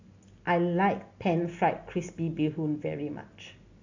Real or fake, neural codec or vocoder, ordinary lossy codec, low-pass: real; none; AAC, 48 kbps; 7.2 kHz